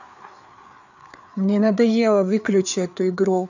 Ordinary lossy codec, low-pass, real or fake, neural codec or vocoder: none; 7.2 kHz; fake; codec, 16 kHz, 4 kbps, FreqCodec, larger model